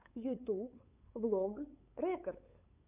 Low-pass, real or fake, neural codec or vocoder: 3.6 kHz; fake; codec, 16 kHz, 8 kbps, FunCodec, trained on LibriTTS, 25 frames a second